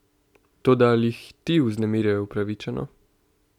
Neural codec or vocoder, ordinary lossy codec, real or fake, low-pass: none; none; real; 19.8 kHz